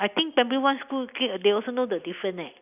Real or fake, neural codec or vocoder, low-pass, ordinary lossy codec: real; none; 3.6 kHz; none